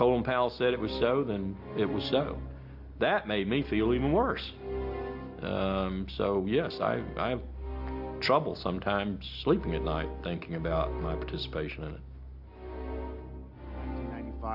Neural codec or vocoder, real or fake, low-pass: none; real; 5.4 kHz